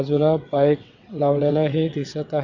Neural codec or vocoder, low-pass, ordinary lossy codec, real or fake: vocoder, 22.05 kHz, 80 mel bands, WaveNeXt; 7.2 kHz; none; fake